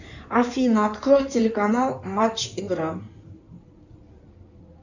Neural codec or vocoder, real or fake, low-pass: codec, 16 kHz in and 24 kHz out, 2.2 kbps, FireRedTTS-2 codec; fake; 7.2 kHz